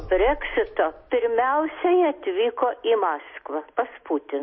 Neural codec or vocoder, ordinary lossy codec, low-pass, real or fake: none; MP3, 24 kbps; 7.2 kHz; real